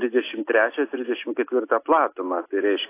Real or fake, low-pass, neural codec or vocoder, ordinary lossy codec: real; 3.6 kHz; none; AAC, 24 kbps